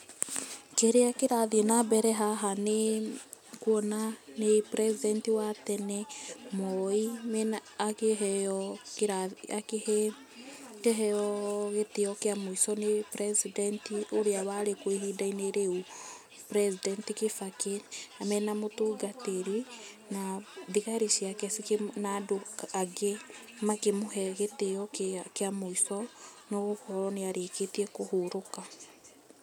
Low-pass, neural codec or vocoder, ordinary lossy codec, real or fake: 19.8 kHz; none; none; real